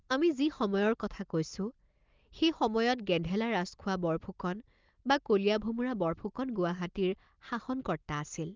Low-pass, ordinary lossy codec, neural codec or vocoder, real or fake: 7.2 kHz; Opus, 32 kbps; none; real